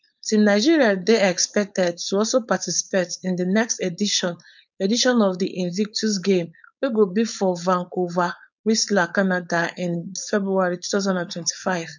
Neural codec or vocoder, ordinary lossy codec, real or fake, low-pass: codec, 16 kHz, 4.8 kbps, FACodec; none; fake; 7.2 kHz